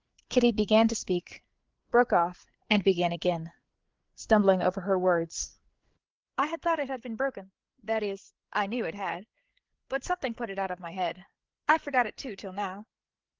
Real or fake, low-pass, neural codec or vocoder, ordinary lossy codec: fake; 7.2 kHz; codec, 16 kHz, 8 kbps, FreqCodec, larger model; Opus, 16 kbps